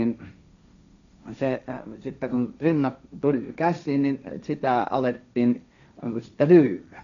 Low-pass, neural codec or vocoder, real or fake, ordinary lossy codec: 7.2 kHz; codec, 16 kHz, 1.1 kbps, Voila-Tokenizer; fake; MP3, 96 kbps